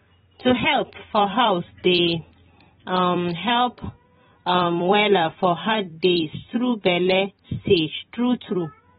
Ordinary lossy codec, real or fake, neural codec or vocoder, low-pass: AAC, 16 kbps; fake; vocoder, 44.1 kHz, 128 mel bands every 256 samples, BigVGAN v2; 19.8 kHz